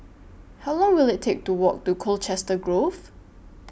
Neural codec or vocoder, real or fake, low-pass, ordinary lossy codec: none; real; none; none